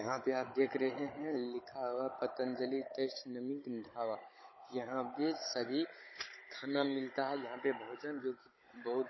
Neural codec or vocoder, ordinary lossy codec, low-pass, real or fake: codec, 16 kHz, 16 kbps, FunCodec, trained on Chinese and English, 50 frames a second; MP3, 24 kbps; 7.2 kHz; fake